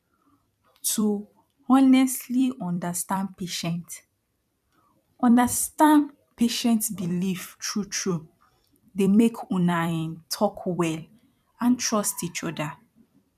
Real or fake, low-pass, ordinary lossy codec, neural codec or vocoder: fake; 14.4 kHz; none; vocoder, 44.1 kHz, 128 mel bands every 256 samples, BigVGAN v2